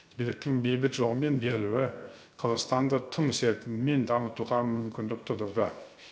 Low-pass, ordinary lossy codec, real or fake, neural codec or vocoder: none; none; fake; codec, 16 kHz, 0.7 kbps, FocalCodec